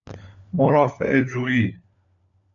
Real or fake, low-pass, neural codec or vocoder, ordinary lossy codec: fake; 7.2 kHz; codec, 16 kHz, 4 kbps, FunCodec, trained on LibriTTS, 50 frames a second; AAC, 64 kbps